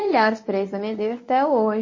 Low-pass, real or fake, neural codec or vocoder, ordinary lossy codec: 7.2 kHz; fake; codec, 24 kHz, 0.9 kbps, WavTokenizer, medium speech release version 1; MP3, 32 kbps